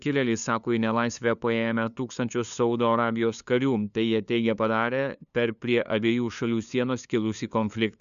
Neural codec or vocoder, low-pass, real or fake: codec, 16 kHz, 2 kbps, FunCodec, trained on LibriTTS, 25 frames a second; 7.2 kHz; fake